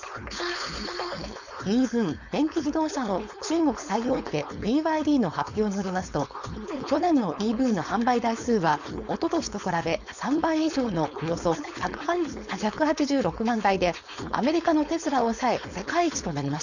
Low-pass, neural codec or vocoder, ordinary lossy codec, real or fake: 7.2 kHz; codec, 16 kHz, 4.8 kbps, FACodec; none; fake